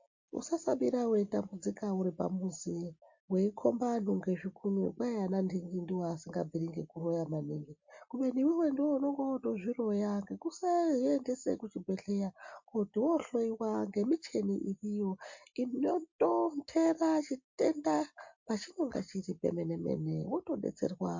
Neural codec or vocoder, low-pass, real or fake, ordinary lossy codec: none; 7.2 kHz; real; MP3, 48 kbps